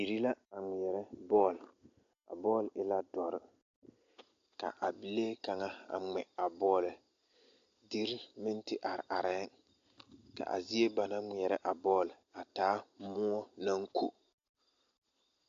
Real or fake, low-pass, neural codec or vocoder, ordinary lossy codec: real; 7.2 kHz; none; AAC, 48 kbps